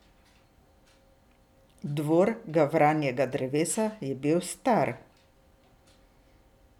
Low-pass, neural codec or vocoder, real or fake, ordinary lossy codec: 19.8 kHz; none; real; none